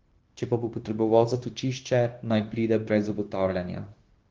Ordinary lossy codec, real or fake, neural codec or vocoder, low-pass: Opus, 16 kbps; fake; codec, 16 kHz, 0.9 kbps, LongCat-Audio-Codec; 7.2 kHz